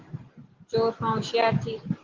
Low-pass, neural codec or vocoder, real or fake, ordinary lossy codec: 7.2 kHz; none; real; Opus, 16 kbps